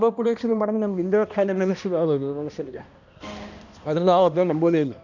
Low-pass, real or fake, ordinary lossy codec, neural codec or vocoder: 7.2 kHz; fake; none; codec, 16 kHz, 1 kbps, X-Codec, HuBERT features, trained on balanced general audio